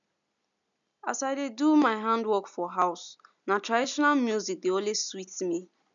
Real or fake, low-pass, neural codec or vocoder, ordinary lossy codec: real; 7.2 kHz; none; none